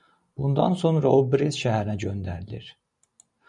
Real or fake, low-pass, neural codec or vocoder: real; 10.8 kHz; none